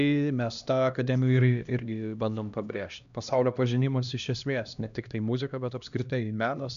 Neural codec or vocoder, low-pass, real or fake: codec, 16 kHz, 1 kbps, X-Codec, HuBERT features, trained on LibriSpeech; 7.2 kHz; fake